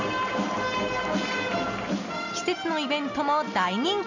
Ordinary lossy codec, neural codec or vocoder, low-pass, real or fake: none; none; 7.2 kHz; real